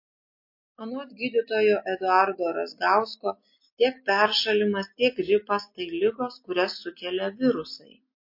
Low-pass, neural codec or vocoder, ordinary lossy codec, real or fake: 5.4 kHz; none; MP3, 32 kbps; real